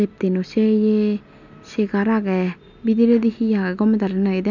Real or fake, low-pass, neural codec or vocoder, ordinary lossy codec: real; 7.2 kHz; none; none